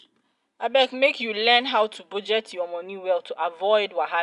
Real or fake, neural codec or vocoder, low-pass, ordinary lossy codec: real; none; 10.8 kHz; none